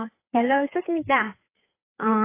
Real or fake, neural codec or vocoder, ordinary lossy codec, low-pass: fake; codec, 16 kHz, 4 kbps, FreqCodec, larger model; AAC, 24 kbps; 3.6 kHz